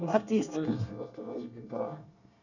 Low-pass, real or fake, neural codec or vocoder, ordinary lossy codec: 7.2 kHz; fake; codec, 24 kHz, 1 kbps, SNAC; none